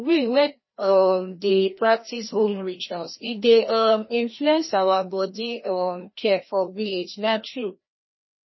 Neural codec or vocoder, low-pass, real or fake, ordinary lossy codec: codec, 16 kHz, 1 kbps, FreqCodec, larger model; 7.2 kHz; fake; MP3, 24 kbps